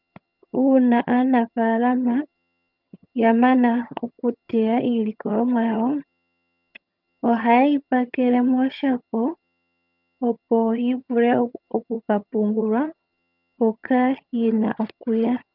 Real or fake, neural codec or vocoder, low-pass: fake; vocoder, 22.05 kHz, 80 mel bands, HiFi-GAN; 5.4 kHz